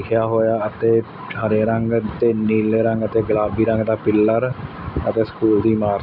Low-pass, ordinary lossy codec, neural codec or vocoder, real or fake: 5.4 kHz; none; none; real